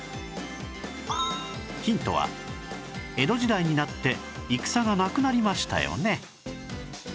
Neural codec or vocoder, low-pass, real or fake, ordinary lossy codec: none; none; real; none